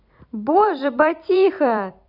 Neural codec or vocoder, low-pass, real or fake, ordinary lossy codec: vocoder, 44.1 kHz, 128 mel bands every 256 samples, BigVGAN v2; 5.4 kHz; fake; none